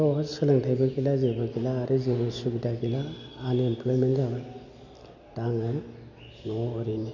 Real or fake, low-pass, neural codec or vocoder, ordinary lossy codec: real; 7.2 kHz; none; none